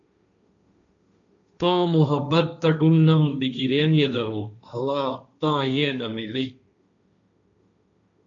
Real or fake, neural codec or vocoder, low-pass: fake; codec, 16 kHz, 1.1 kbps, Voila-Tokenizer; 7.2 kHz